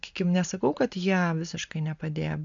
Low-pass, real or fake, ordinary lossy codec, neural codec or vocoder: 7.2 kHz; real; MP3, 64 kbps; none